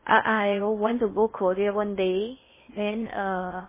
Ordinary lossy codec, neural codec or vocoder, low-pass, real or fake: MP3, 16 kbps; codec, 16 kHz in and 24 kHz out, 0.6 kbps, FocalCodec, streaming, 2048 codes; 3.6 kHz; fake